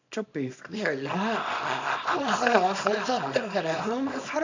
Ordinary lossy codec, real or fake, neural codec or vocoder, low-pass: AAC, 48 kbps; fake; codec, 24 kHz, 0.9 kbps, WavTokenizer, small release; 7.2 kHz